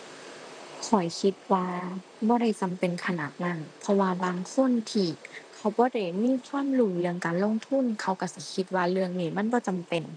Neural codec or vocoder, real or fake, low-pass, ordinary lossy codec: vocoder, 44.1 kHz, 128 mel bands, Pupu-Vocoder; fake; 9.9 kHz; none